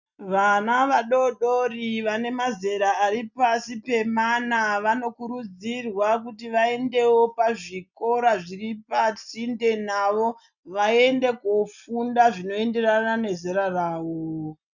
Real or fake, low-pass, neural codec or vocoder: real; 7.2 kHz; none